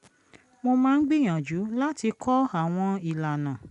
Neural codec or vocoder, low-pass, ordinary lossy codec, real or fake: none; 10.8 kHz; none; real